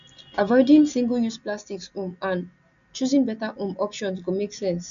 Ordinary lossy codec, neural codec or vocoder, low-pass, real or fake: none; none; 7.2 kHz; real